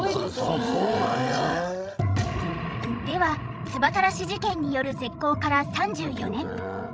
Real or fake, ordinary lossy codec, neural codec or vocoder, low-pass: fake; none; codec, 16 kHz, 16 kbps, FreqCodec, larger model; none